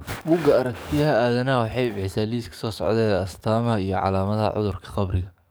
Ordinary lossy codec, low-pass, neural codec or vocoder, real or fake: none; none; codec, 44.1 kHz, 7.8 kbps, DAC; fake